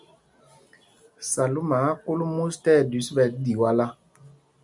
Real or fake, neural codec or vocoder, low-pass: real; none; 10.8 kHz